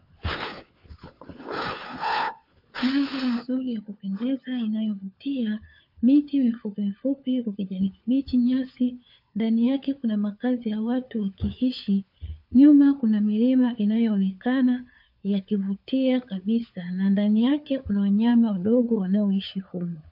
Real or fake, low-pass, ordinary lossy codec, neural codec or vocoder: fake; 5.4 kHz; AAC, 48 kbps; codec, 16 kHz, 4 kbps, FunCodec, trained on LibriTTS, 50 frames a second